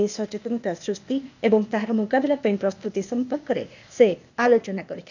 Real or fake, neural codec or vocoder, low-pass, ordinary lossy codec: fake; codec, 16 kHz, 0.8 kbps, ZipCodec; 7.2 kHz; none